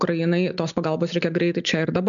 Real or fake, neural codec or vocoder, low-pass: real; none; 7.2 kHz